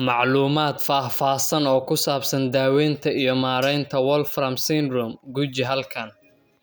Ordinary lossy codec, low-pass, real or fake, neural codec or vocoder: none; none; real; none